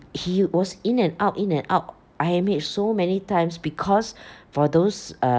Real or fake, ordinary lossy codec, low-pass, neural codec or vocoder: real; none; none; none